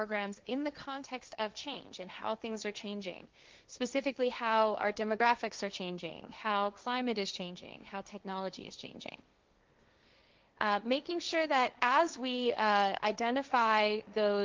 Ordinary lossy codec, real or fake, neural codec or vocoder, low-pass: Opus, 32 kbps; fake; codec, 16 kHz, 1.1 kbps, Voila-Tokenizer; 7.2 kHz